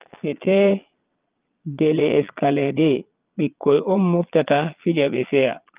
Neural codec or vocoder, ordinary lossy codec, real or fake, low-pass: vocoder, 22.05 kHz, 80 mel bands, Vocos; Opus, 32 kbps; fake; 3.6 kHz